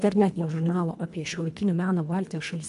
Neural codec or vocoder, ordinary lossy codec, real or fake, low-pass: codec, 24 kHz, 1.5 kbps, HILCodec; MP3, 96 kbps; fake; 10.8 kHz